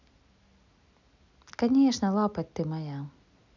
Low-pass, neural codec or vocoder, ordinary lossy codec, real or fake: 7.2 kHz; none; none; real